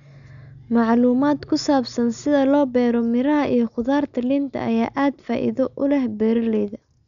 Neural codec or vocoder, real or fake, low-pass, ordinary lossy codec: none; real; 7.2 kHz; none